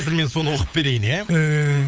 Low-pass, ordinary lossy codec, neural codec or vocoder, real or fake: none; none; codec, 16 kHz, 8 kbps, FunCodec, trained on LibriTTS, 25 frames a second; fake